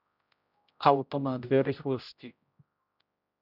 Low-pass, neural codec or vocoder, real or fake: 5.4 kHz; codec, 16 kHz, 0.5 kbps, X-Codec, HuBERT features, trained on general audio; fake